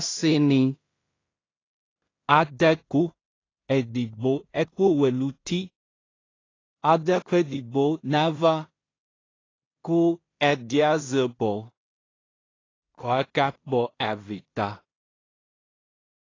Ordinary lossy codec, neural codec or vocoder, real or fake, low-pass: AAC, 32 kbps; codec, 16 kHz in and 24 kHz out, 0.4 kbps, LongCat-Audio-Codec, two codebook decoder; fake; 7.2 kHz